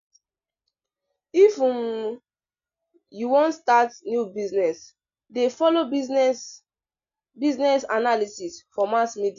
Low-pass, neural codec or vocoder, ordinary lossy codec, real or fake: 7.2 kHz; none; none; real